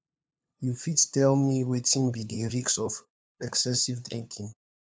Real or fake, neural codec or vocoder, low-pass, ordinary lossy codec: fake; codec, 16 kHz, 2 kbps, FunCodec, trained on LibriTTS, 25 frames a second; none; none